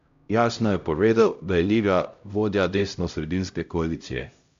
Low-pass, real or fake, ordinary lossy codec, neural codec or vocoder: 7.2 kHz; fake; AAC, 64 kbps; codec, 16 kHz, 0.5 kbps, X-Codec, HuBERT features, trained on LibriSpeech